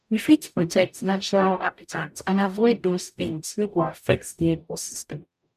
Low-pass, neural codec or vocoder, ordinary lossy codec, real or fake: 14.4 kHz; codec, 44.1 kHz, 0.9 kbps, DAC; none; fake